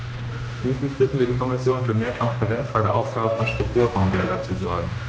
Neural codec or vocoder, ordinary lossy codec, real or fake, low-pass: codec, 16 kHz, 1 kbps, X-Codec, HuBERT features, trained on general audio; none; fake; none